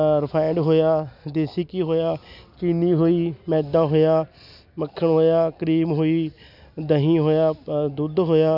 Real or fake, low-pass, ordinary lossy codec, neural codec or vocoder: real; 5.4 kHz; none; none